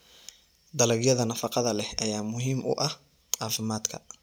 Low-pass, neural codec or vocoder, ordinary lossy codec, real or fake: none; none; none; real